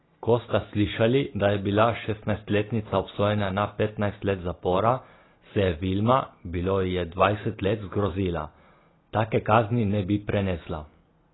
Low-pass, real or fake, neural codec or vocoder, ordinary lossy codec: 7.2 kHz; real; none; AAC, 16 kbps